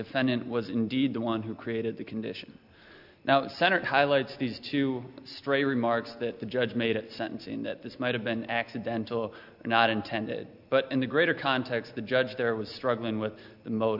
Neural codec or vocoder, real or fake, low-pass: none; real; 5.4 kHz